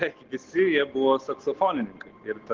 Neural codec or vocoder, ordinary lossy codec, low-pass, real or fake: none; Opus, 16 kbps; 7.2 kHz; real